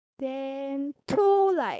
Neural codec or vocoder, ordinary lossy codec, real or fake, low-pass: codec, 16 kHz, 4.8 kbps, FACodec; none; fake; none